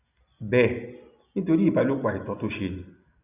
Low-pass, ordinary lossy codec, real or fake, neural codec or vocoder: 3.6 kHz; none; real; none